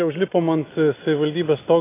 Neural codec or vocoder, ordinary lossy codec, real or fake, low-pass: none; AAC, 16 kbps; real; 3.6 kHz